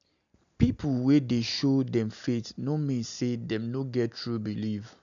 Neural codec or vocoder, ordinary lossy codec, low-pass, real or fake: none; MP3, 96 kbps; 7.2 kHz; real